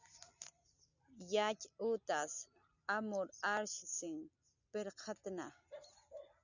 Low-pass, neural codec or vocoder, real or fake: 7.2 kHz; none; real